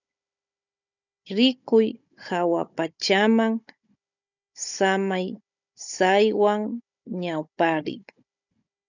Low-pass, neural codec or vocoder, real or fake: 7.2 kHz; codec, 16 kHz, 16 kbps, FunCodec, trained on Chinese and English, 50 frames a second; fake